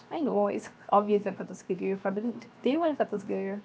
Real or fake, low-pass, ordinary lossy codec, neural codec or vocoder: fake; none; none; codec, 16 kHz, 0.7 kbps, FocalCodec